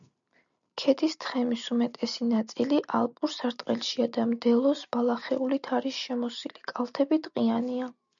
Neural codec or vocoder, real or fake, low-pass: none; real; 7.2 kHz